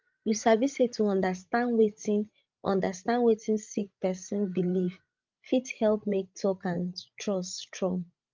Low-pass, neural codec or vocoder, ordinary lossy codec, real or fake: 7.2 kHz; codec, 16 kHz, 16 kbps, FreqCodec, larger model; Opus, 24 kbps; fake